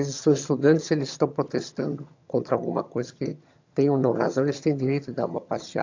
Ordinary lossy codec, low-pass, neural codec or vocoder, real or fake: AAC, 48 kbps; 7.2 kHz; vocoder, 22.05 kHz, 80 mel bands, HiFi-GAN; fake